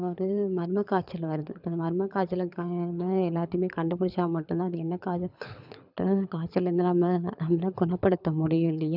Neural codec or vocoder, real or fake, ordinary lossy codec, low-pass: codec, 24 kHz, 6 kbps, HILCodec; fake; none; 5.4 kHz